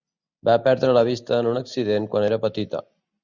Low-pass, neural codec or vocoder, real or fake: 7.2 kHz; none; real